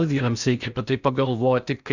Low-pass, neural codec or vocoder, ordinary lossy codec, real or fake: 7.2 kHz; codec, 16 kHz in and 24 kHz out, 0.8 kbps, FocalCodec, streaming, 65536 codes; Opus, 64 kbps; fake